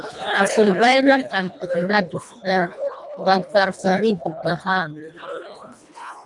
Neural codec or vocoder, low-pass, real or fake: codec, 24 kHz, 1.5 kbps, HILCodec; 10.8 kHz; fake